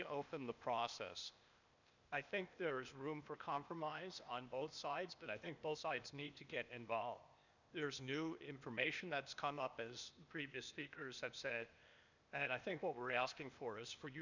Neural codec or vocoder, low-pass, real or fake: codec, 16 kHz, 0.8 kbps, ZipCodec; 7.2 kHz; fake